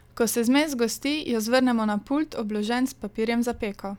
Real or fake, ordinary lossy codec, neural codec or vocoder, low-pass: real; none; none; 19.8 kHz